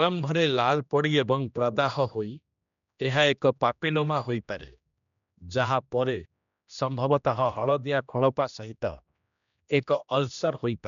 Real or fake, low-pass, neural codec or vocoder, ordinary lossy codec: fake; 7.2 kHz; codec, 16 kHz, 1 kbps, X-Codec, HuBERT features, trained on general audio; MP3, 96 kbps